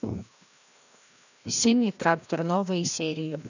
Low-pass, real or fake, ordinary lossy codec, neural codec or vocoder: 7.2 kHz; fake; none; codec, 16 kHz, 1 kbps, FreqCodec, larger model